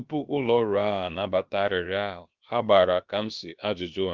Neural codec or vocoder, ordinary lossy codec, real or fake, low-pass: codec, 16 kHz, about 1 kbps, DyCAST, with the encoder's durations; Opus, 24 kbps; fake; 7.2 kHz